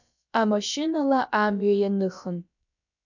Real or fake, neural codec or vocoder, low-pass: fake; codec, 16 kHz, about 1 kbps, DyCAST, with the encoder's durations; 7.2 kHz